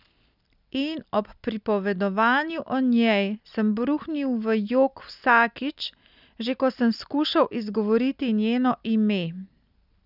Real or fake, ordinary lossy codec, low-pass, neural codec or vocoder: real; none; 5.4 kHz; none